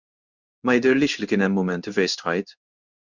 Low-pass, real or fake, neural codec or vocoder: 7.2 kHz; fake; codec, 16 kHz in and 24 kHz out, 1 kbps, XY-Tokenizer